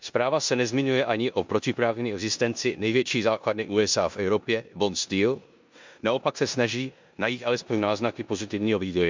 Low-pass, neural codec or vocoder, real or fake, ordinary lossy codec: 7.2 kHz; codec, 16 kHz in and 24 kHz out, 0.9 kbps, LongCat-Audio-Codec, four codebook decoder; fake; MP3, 64 kbps